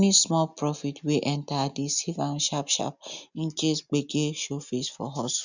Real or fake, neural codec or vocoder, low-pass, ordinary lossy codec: real; none; 7.2 kHz; none